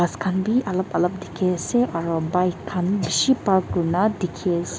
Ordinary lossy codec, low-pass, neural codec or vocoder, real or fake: none; none; none; real